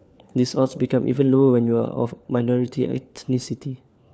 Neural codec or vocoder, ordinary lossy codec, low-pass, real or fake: codec, 16 kHz, 4 kbps, FunCodec, trained on LibriTTS, 50 frames a second; none; none; fake